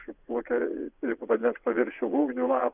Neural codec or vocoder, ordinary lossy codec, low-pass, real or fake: vocoder, 22.05 kHz, 80 mel bands, WaveNeXt; Opus, 64 kbps; 3.6 kHz; fake